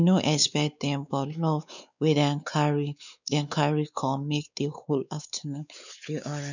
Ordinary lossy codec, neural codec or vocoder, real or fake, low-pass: none; codec, 16 kHz, 4 kbps, X-Codec, WavLM features, trained on Multilingual LibriSpeech; fake; 7.2 kHz